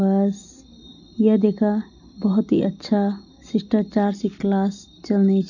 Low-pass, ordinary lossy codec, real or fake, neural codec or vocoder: 7.2 kHz; none; real; none